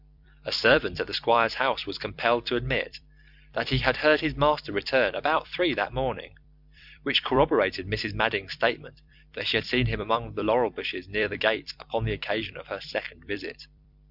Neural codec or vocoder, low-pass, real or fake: vocoder, 44.1 kHz, 128 mel bands every 256 samples, BigVGAN v2; 5.4 kHz; fake